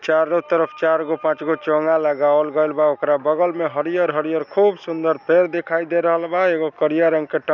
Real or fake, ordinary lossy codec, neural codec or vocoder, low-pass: real; none; none; 7.2 kHz